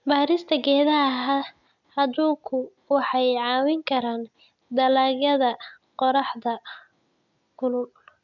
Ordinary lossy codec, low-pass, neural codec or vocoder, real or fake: none; 7.2 kHz; none; real